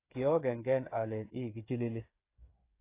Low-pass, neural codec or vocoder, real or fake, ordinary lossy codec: 3.6 kHz; codec, 16 kHz, 8 kbps, FreqCodec, smaller model; fake; AAC, 24 kbps